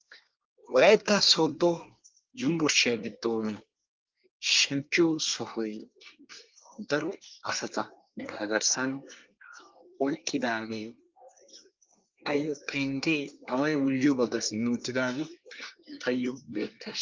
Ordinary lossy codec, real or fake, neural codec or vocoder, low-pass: Opus, 24 kbps; fake; codec, 24 kHz, 1 kbps, SNAC; 7.2 kHz